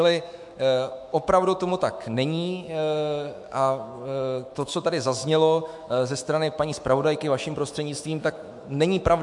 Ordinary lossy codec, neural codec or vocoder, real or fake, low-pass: MP3, 64 kbps; autoencoder, 48 kHz, 128 numbers a frame, DAC-VAE, trained on Japanese speech; fake; 10.8 kHz